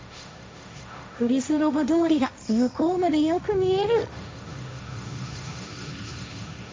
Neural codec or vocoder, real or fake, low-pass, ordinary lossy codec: codec, 16 kHz, 1.1 kbps, Voila-Tokenizer; fake; none; none